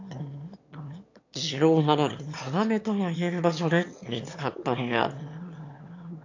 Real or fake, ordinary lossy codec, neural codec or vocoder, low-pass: fake; AAC, 48 kbps; autoencoder, 22.05 kHz, a latent of 192 numbers a frame, VITS, trained on one speaker; 7.2 kHz